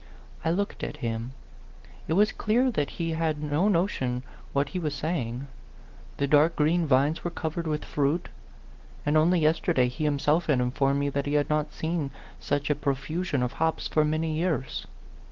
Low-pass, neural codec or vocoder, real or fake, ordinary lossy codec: 7.2 kHz; none; real; Opus, 16 kbps